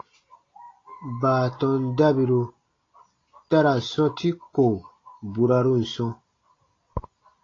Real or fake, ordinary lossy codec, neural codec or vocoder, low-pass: real; AAC, 32 kbps; none; 7.2 kHz